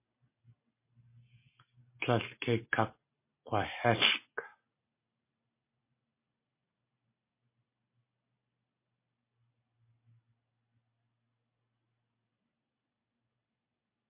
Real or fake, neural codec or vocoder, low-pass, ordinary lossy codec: real; none; 3.6 kHz; MP3, 24 kbps